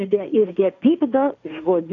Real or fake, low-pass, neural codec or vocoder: fake; 7.2 kHz; codec, 16 kHz, 1.1 kbps, Voila-Tokenizer